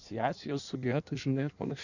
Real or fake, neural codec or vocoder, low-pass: fake; codec, 16 kHz in and 24 kHz out, 1.1 kbps, FireRedTTS-2 codec; 7.2 kHz